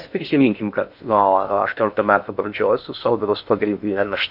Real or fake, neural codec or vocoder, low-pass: fake; codec, 16 kHz in and 24 kHz out, 0.6 kbps, FocalCodec, streaming, 2048 codes; 5.4 kHz